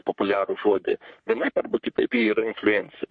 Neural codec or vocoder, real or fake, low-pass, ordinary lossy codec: codec, 44.1 kHz, 3.4 kbps, Pupu-Codec; fake; 9.9 kHz; MP3, 48 kbps